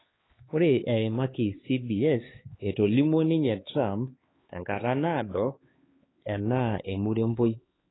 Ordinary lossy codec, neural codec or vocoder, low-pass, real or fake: AAC, 16 kbps; codec, 16 kHz, 4 kbps, X-Codec, HuBERT features, trained on LibriSpeech; 7.2 kHz; fake